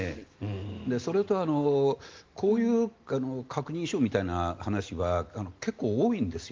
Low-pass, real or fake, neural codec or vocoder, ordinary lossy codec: 7.2 kHz; real; none; Opus, 32 kbps